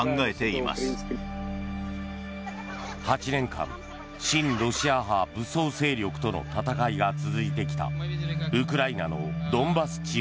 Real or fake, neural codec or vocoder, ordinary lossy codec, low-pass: real; none; none; none